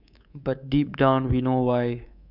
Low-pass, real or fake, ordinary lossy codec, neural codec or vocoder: 5.4 kHz; fake; none; codec, 24 kHz, 3.1 kbps, DualCodec